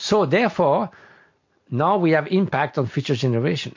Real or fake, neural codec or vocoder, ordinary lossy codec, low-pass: real; none; MP3, 48 kbps; 7.2 kHz